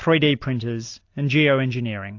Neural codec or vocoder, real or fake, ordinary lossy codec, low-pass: none; real; AAC, 48 kbps; 7.2 kHz